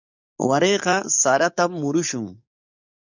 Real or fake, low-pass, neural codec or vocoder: fake; 7.2 kHz; codec, 44.1 kHz, 7.8 kbps, DAC